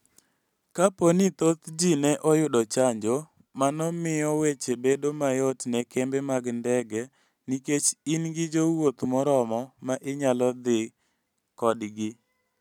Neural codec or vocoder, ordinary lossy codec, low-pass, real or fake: none; none; 19.8 kHz; real